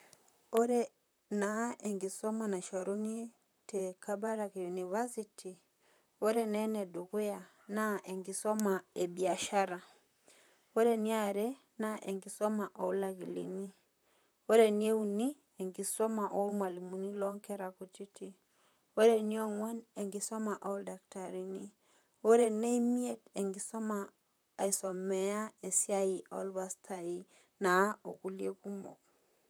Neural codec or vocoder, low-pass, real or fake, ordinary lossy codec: vocoder, 44.1 kHz, 128 mel bands, Pupu-Vocoder; none; fake; none